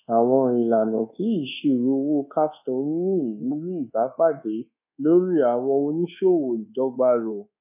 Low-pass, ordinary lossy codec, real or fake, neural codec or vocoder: 3.6 kHz; none; fake; codec, 24 kHz, 1.2 kbps, DualCodec